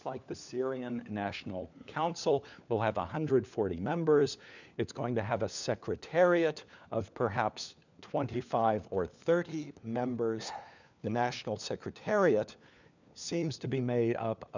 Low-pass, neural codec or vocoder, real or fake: 7.2 kHz; codec, 16 kHz, 4 kbps, FunCodec, trained on LibriTTS, 50 frames a second; fake